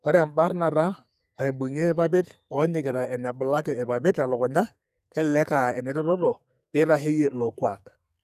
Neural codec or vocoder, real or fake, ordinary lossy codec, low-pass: codec, 44.1 kHz, 2.6 kbps, SNAC; fake; none; 14.4 kHz